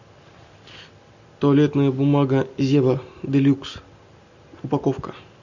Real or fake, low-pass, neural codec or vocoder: real; 7.2 kHz; none